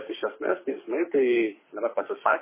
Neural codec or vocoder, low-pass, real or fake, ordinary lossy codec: codec, 24 kHz, 6 kbps, HILCodec; 3.6 kHz; fake; MP3, 16 kbps